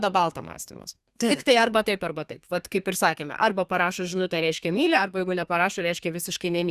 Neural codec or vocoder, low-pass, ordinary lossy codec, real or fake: codec, 32 kHz, 1.9 kbps, SNAC; 14.4 kHz; Opus, 64 kbps; fake